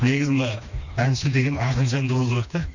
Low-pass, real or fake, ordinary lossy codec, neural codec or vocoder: 7.2 kHz; fake; none; codec, 16 kHz, 2 kbps, FreqCodec, smaller model